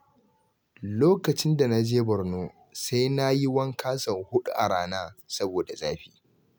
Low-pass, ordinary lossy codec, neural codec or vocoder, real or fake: none; none; none; real